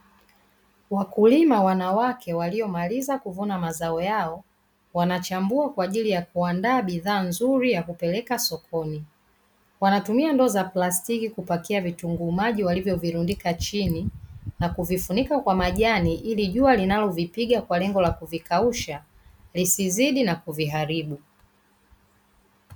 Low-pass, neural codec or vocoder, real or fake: 19.8 kHz; none; real